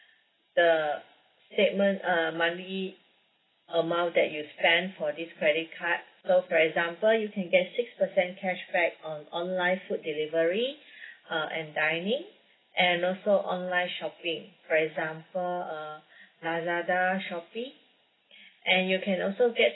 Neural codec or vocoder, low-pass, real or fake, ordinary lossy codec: none; 7.2 kHz; real; AAC, 16 kbps